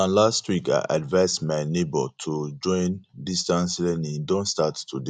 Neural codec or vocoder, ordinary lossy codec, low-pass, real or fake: none; none; 9.9 kHz; real